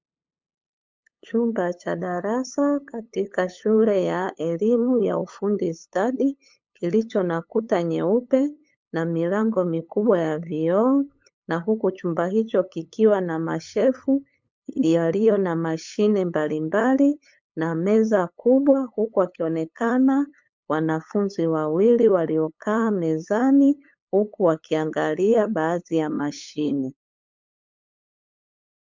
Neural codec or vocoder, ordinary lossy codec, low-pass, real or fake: codec, 16 kHz, 8 kbps, FunCodec, trained on LibriTTS, 25 frames a second; MP3, 64 kbps; 7.2 kHz; fake